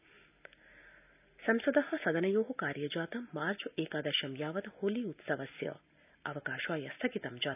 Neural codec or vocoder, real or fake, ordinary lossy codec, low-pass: none; real; none; 3.6 kHz